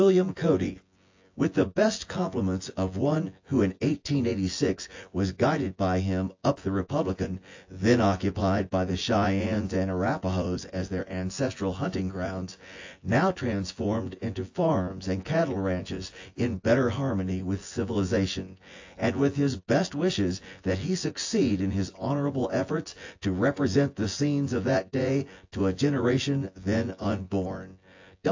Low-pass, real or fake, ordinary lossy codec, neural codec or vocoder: 7.2 kHz; fake; AAC, 48 kbps; vocoder, 24 kHz, 100 mel bands, Vocos